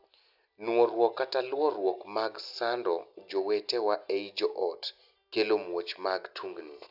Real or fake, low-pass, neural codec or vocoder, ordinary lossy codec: real; 5.4 kHz; none; none